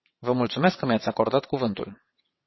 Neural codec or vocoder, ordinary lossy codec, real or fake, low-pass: vocoder, 22.05 kHz, 80 mel bands, WaveNeXt; MP3, 24 kbps; fake; 7.2 kHz